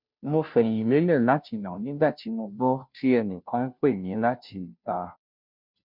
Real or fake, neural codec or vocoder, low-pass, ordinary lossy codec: fake; codec, 16 kHz, 0.5 kbps, FunCodec, trained on Chinese and English, 25 frames a second; 5.4 kHz; none